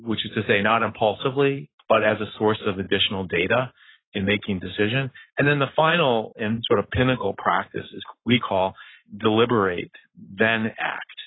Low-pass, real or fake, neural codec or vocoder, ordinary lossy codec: 7.2 kHz; fake; codec, 44.1 kHz, 7.8 kbps, Pupu-Codec; AAC, 16 kbps